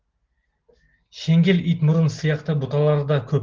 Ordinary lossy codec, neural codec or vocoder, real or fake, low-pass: Opus, 16 kbps; none; real; 7.2 kHz